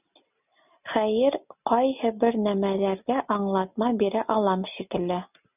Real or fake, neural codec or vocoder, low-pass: real; none; 3.6 kHz